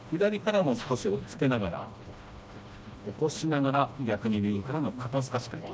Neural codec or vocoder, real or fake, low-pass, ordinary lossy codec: codec, 16 kHz, 1 kbps, FreqCodec, smaller model; fake; none; none